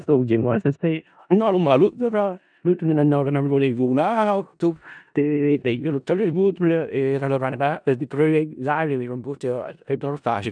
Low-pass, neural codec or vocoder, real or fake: 9.9 kHz; codec, 16 kHz in and 24 kHz out, 0.4 kbps, LongCat-Audio-Codec, four codebook decoder; fake